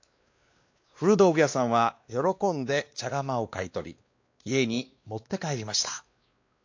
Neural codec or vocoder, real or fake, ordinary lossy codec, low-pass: codec, 16 kHz, 2 kbps, X-Codec, WavLM features, trained on Multilingual LibriSpeech; fake; AAC, 48 kbps; 7.2 kHz